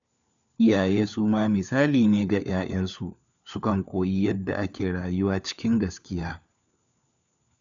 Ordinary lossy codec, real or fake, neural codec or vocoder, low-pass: none; fake; codec, 16 kHz, 4 kbps, FunCodec, trained on LibriTTS, 50 frames a second; 7.2 kHz